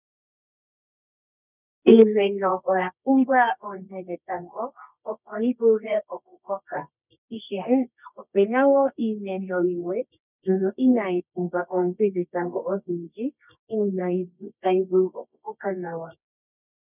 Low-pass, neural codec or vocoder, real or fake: 3.6 kHz; codec, 24 kHz, 0.9 kbps, WavTokenizer, medium music audio release; fake